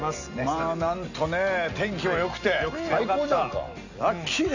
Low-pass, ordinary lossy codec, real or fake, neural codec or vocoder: 7.2 kHz; none; real; none